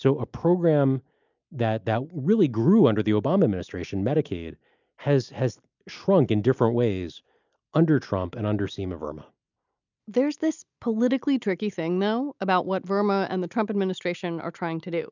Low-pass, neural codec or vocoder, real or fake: 7.2 kHz; none; real